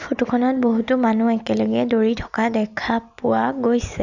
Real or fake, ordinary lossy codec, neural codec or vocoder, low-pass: real; none; none; 7.2 kHz